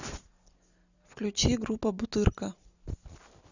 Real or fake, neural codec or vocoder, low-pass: real; none; 7.2 kHz